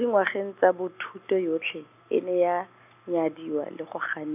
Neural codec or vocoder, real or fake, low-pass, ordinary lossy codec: none; real; 3.6 kHz; none